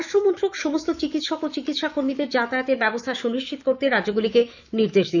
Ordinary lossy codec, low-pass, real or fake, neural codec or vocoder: none; 7.2 kHz; fake; autoencoder, 48 kHz, 128 numbers a frame, DAC-VAE, trained on Japanese speech